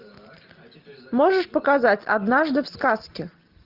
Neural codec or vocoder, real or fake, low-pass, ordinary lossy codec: none; real; 5.4 kHz; Opus, 32 kbps